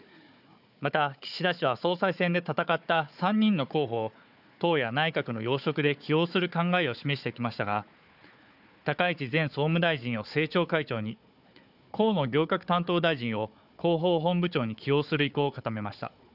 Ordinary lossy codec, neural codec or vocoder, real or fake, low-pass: none; codec, 16 kHz, 4 kbps, FunCodec, trained on Chinese and English, 50 frames a second; fake; 5.4 kHz